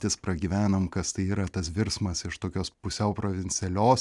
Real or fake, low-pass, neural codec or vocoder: real; 10.8 kHz; none